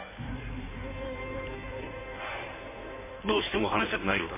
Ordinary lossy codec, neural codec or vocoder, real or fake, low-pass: MP3, 16 kbps; codec, 16 kHz in and 24 kHz out, 1.1 kbps, FireRedTTS-2 codec; fake; 3.6 kHz